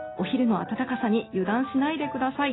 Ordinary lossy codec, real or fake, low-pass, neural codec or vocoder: AAC, 16 kbps; real; 7.2 kHz; none